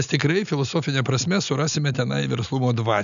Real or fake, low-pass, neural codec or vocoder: real; 7.2 kHz; none